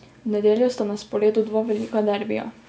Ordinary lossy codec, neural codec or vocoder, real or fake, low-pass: none; none; real; none